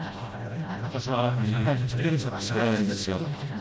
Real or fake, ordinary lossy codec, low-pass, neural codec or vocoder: fake; none; none; codec, 16 kHz, 0.5 kbps, FreqCodec, smaller model